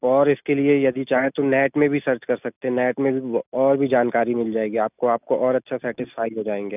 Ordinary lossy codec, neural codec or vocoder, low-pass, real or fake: none; none; 3.6 kHz; real